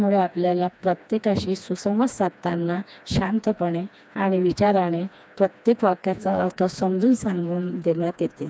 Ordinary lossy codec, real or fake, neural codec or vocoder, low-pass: none; fake; codec, 16 kHz, 2 kbps, FreqCodec, smaller model; none